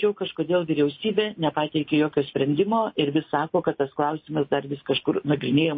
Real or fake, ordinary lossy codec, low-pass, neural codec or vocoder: real; MP3, 24 kbps; 7.2 kHz; none